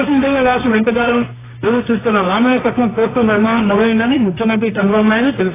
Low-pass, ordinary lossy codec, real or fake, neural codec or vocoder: 3.6 kHz; AAC, 16 kbps; fake; codec, 24 kHz, 0.9 kbps, WavTokenizer, medium music audio release